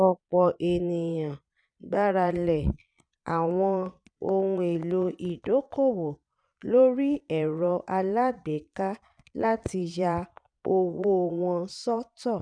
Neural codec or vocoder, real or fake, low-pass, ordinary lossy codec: vocoder, 22.05 kHz, 80 mel bands, Vocos; fake; 9.9 kHz; none